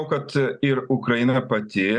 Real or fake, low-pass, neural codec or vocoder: real; 9.9 kHz; none